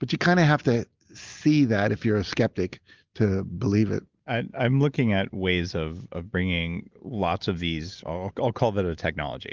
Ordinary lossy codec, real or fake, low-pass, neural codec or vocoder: Opus, 32 kbps; real; 7.2 kHz; none